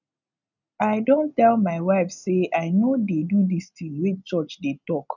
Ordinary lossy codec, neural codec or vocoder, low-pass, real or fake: none; none; 7.2 kHz; real